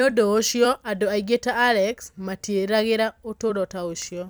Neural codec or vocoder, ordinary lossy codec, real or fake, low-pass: vocoder, 44.1 kHz, 128 mel bands every 256 samples, BigVGAN v2; none; fake; none